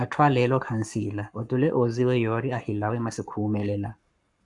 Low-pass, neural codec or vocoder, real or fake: 10.8 kHz; codec, 44.1 kHz, 7.8 kbps, Pupu-Codec; fake